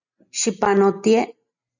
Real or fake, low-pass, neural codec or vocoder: real; 7.2 kHz; none